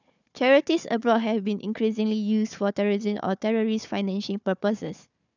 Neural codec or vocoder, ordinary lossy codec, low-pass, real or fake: codec, 16 kHz, 4 kbps, FunCodec, trained on Chinese and English, 50 frames a second; none; 7.2 kHz; fake